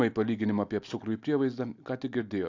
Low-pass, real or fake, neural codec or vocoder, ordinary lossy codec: 7.2 kHz; real; none; AAC, 48 kbps